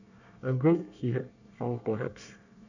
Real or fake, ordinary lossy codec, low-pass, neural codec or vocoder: fake; none; 7.2 kHz; codec, 24 kHz, 1 kbps, SNAC